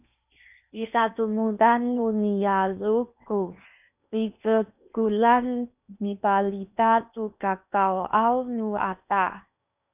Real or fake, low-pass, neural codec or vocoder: fake; 3.6 kHz; codec, 16 kHz in and 24 kHz out, 0.8 kbps, FocalCodec, streaming, 65536 codes